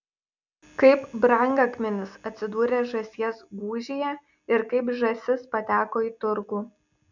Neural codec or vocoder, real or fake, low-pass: none; real; 7.2 kHz